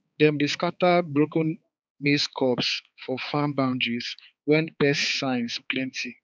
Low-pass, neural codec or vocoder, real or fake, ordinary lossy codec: none; codec, 16 kHz, 4 kbps, X-Codec, HuBERT features, trained on balanced general audio; fake; none